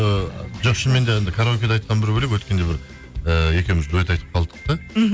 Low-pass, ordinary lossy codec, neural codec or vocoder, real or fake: none; none; none; real